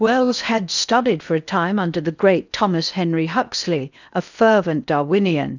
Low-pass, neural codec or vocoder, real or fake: 7.2 kHz; codec, 16 kHz in and 24 kHz out, 0.8 kbps, FocalCodec, streaming, 65536 codes; fake